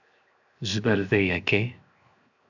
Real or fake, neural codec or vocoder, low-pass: fake; codec, 16 kHz, 0.7 kbps, FocalCodec; 7.2 kHz